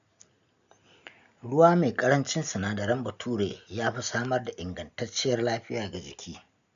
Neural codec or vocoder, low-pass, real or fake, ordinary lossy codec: none; 7.2 kHz; real; none